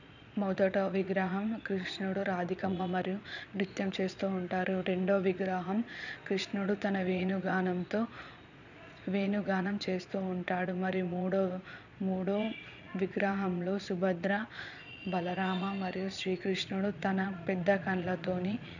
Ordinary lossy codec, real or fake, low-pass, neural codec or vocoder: none; fake; 7.2 kHz; vocoder, 22.05 kHz, 80 mel bands, Vocos